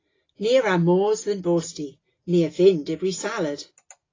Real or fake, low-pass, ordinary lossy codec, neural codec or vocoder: real; 7.2 kHz; AAC, 32 kbps; none